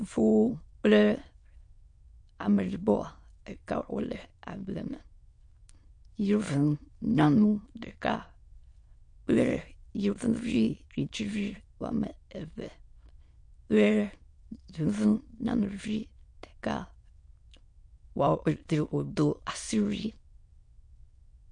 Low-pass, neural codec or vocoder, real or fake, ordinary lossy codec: 9.9 kHz; autoencoder, 22.05 kHz, a latent of 192 numbers a frame, VITS, trained on many speakers; fake; MP3, 48 kbps